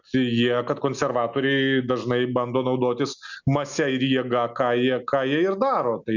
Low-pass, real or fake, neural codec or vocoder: 7.2 kHz; real; none